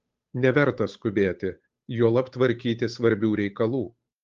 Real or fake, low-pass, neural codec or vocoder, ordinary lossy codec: fake; 7.2 kHz; codec, 16 kHz, 8 kbps, FunCodec, trained on Chinese and English, 25 frames a second; Opus, 32 kbps